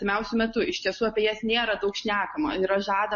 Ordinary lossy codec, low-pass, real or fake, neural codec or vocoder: MP3, 32 kbps; 7.2 kHz; real; none